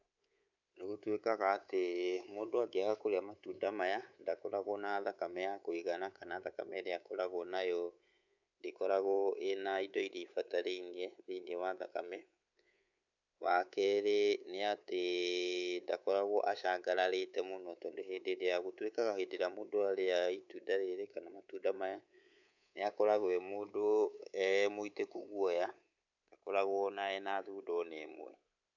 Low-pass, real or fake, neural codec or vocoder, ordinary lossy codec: 7.2 kHz; fake; codec, 24 kHz, 3.1 kbps, DualCodec; none